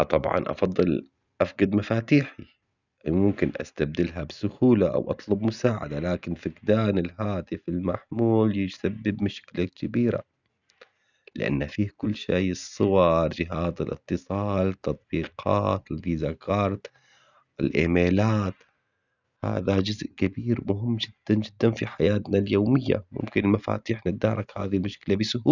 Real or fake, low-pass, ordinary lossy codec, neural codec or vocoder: real; 7.2 kHz; none; none